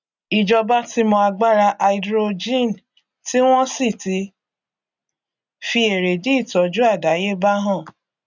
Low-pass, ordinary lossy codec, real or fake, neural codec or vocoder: 7.2 kHz; none; real; none